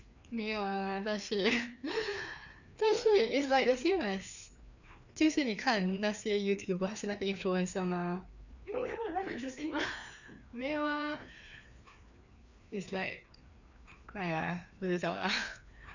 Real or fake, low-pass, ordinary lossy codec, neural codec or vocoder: fake; 7.2 kHz; none; codec, 16 kHz, 2 kbps, FreqCodec, larger model